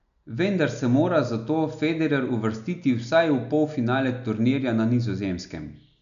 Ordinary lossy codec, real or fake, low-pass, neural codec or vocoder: AAC, 96 kbps; real; 7.2 kHz; none